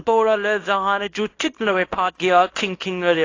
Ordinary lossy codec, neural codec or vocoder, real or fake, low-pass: AAC, 32 kbps; codec, 24 kHz, 0.9 kbps, WavTokenizer, small release; fake; 7.2 kHz